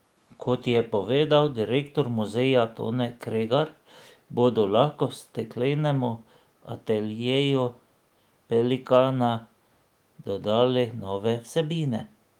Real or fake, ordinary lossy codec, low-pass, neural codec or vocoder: fake; Opus, 24 kbps; 19.8 kHz; autoencoder, 48 kHz, 128 numbers a frame, DAC-VAE, trained on Japanese speech